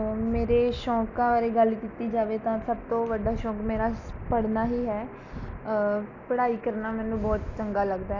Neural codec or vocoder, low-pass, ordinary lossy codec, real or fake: none; 7.2 kHz; none; real